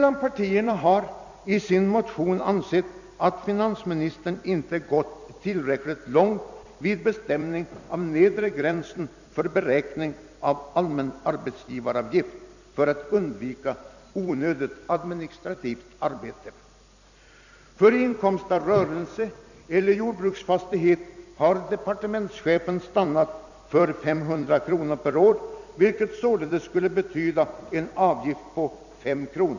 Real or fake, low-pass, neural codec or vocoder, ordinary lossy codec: fake; 7.2 kHz; vocoder, 44.1 kHz, 128 mel bands every 256 samples, BigVGAN v2; none